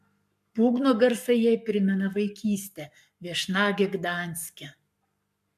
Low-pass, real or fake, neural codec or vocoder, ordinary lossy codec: 14.4 kHz; fake; codec, 44.1 kHz, 7.8 kbps, Pupu-Codec; MP3, 96 kbps